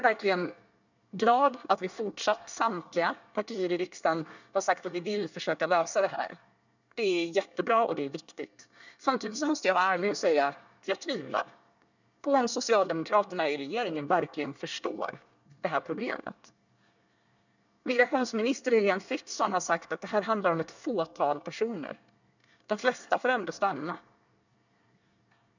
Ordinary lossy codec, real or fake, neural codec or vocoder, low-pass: none; fake; codec, 24 kHz, 1 kbps, SNAC; 7.2 kHz